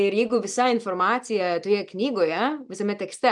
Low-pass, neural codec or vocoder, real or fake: 10.8 kHz; none; real